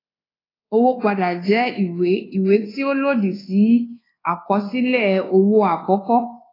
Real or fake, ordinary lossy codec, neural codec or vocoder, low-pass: fake; AAC, 24 kbps; codec, 24 kHz, 1.2 kbps, DualCodec; 5.4 kHz